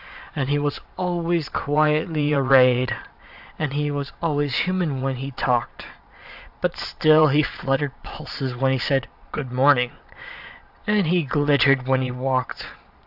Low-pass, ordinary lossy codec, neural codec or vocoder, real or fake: 5.4 kHz; AAC, 48 kbps; vocoder, 22.05 kHz, 80 mel bands, Vocos; fake